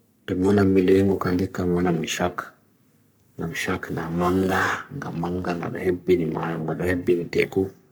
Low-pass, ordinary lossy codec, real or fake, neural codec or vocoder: none; none; fake; codec, 44.1 kHz, 3.4 kbps, Pupu-Codec